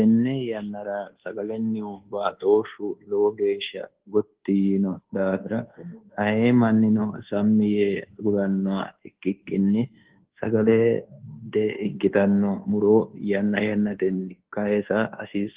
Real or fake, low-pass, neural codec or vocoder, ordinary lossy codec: fake; 3.6 kHz; codec, 24 kHz, 1.2 kbps, DualCodec; Opus, 16 kbps